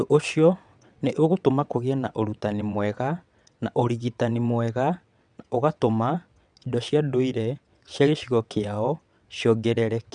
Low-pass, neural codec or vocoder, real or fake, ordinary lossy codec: 9.9 kHz; vocoder, 22.05 kHz, 80 mel bands, WaveNeXt; fake; none